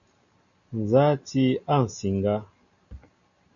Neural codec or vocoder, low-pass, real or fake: none; 7.2 kHz; real